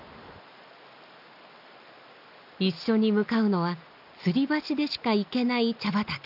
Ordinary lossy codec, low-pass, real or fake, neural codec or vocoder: none; 5.4 kHz; real; none